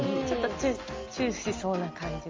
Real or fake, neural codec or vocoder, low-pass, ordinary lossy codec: real; none; 7.2 kHz; Opus, 32 kbps